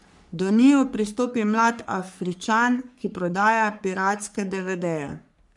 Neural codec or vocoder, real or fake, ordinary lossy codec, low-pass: codec, 44.1 kHz, 3.4 kbps, Pupu-Codec; fake; none; 10.8 kHz